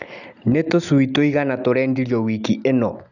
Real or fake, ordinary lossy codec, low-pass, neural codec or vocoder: real; none; 7.2 kHz; none